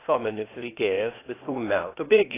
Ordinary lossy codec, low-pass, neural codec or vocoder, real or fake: AAC, 16 kbps; 3.6 kHz; codec, 16 kHz, 0.8 kbps, ZipCodec; fake